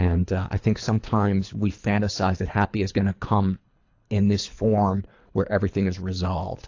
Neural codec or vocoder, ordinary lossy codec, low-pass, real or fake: codec, 24 kHz, 3 kbps, HILCodec; AAC, 48 kbps; 7.2 kHz; fake